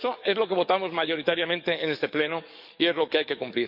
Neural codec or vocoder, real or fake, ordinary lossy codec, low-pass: codec, 44.1 kHz, 7.8 kbps, DAC; fake; none; 5.4 kHz